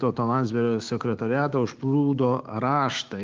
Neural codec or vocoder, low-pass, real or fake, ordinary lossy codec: codec, 16 kHz, 6 kbps, DAC; 7.2 kHz; fake; Opus, 24 kbps